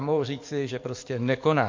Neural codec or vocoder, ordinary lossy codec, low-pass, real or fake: codec, 44.1 kHz, 7.8 kbps, DAC; MP3, 48 kbps; 7.2 kHz; fake